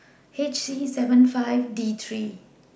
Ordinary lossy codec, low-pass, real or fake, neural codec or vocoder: none; none; real; none